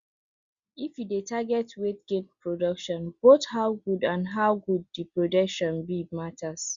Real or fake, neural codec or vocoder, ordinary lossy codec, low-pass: real; none; Opus, 64 kbps; 7.2 kHz